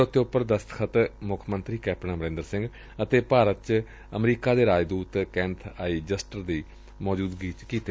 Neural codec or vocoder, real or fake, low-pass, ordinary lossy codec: none; real; none; none